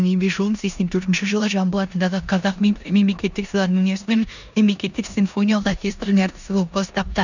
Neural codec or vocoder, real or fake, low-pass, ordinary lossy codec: codec, 16 kHz in and 24 kHz out, 0.9 kbps, LongCat-Audio-Codec, four codebook decoder; fake; 7.2 kHz; none